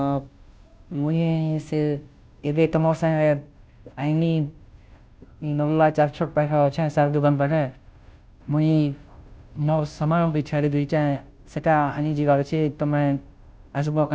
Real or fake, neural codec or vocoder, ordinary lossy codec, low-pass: fake; codec, 16 kHz, 0.5 kbps, FunCodec, trained on Chinese and English, 25 frames a second; none; none